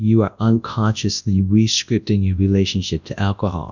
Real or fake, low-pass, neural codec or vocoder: fake; 7.2 kHz; codec, 24 kHz, 0.9 kbps, WavTokenizer, large speech release